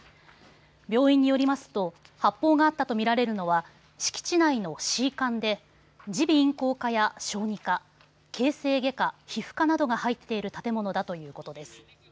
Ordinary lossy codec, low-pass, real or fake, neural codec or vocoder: none; none; real; none